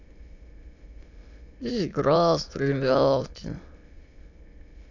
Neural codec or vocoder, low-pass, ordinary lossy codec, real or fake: autoencoder, 22.05 kHz, a latent of 192 numbers a frame, VITS, trained on many speakers; 7.2 kHz; none; fake